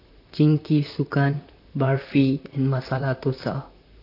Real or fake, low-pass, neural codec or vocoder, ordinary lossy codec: fake; 5.4 kHz; vocoder, 44.1 kHz, 128 mel bands, Pupu-Vocoder; AAC, 32 kbps